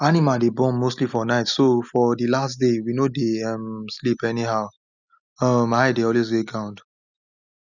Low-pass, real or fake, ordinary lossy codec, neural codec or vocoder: 7.2 kHz; real; none; none